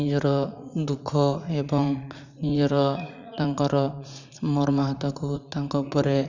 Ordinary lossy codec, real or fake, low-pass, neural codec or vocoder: none; fake; 7.2 kHz; vocoder, 44.1 kHz, 80 mel bands, Vocos